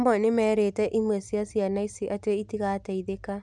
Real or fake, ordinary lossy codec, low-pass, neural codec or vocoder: real; none; none; none